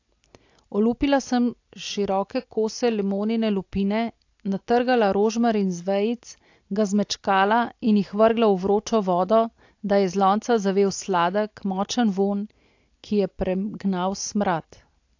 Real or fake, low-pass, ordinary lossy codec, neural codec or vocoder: real; 7.2 kHz; AAC, 48 kbps; none